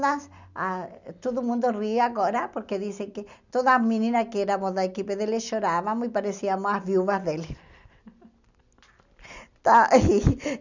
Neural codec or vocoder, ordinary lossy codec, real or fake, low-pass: none; none; real; 7.2 kHz